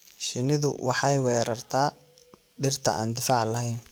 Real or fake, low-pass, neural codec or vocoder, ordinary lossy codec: fake; none; codec, 44.1 kHz, 7.8 kbps, DAC; none